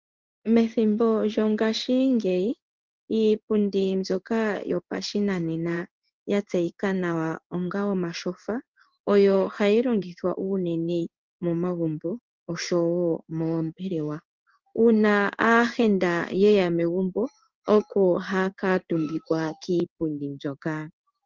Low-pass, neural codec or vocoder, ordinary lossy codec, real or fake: 7.2 kHz; codec, 16 kHz in and 24 kHz out, 1 kbps, XY-Tokenizer; Opus, 32 kbps; fake